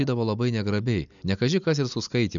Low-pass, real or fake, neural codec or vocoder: 7.2 kHz; real; none